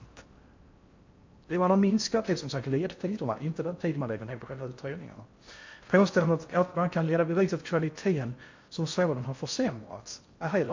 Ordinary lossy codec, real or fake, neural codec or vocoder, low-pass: MP3, 48 kbps; fake; codec, 16 kHz in and 24 kHz out, 0.6 kbps, FocalCodec, streaming, 4096 codes; 7.2 kHz